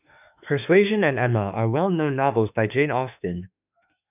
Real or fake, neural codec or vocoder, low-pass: fake; autoencoder, 48 kHz, 32 numbers a frame, DAC-VAE, trained on Japanese speech; 3.6 kHz